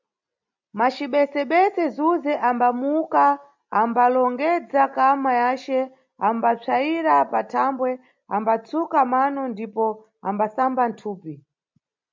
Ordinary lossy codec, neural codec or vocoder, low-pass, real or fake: MP3, 64 kbps; none; 7.2 kHz; real